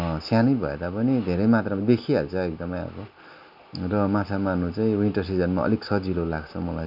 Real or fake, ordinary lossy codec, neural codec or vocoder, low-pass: real; none; none; 5.4 kHz